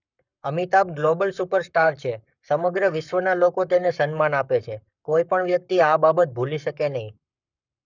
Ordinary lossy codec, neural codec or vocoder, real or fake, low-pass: none; codec, 44.1 kHz, 7.8 kbps, Pupu-Codec; fake; 7.2 kHz